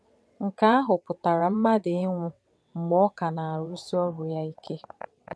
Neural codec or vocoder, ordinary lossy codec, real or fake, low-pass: vocoder, 22.05 kHz, 80 mel bands, WaveNeXt; none; fake; none